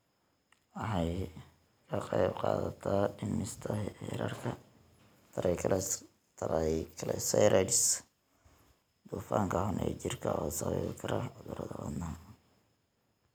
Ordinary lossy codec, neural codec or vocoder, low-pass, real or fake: none; none; none; real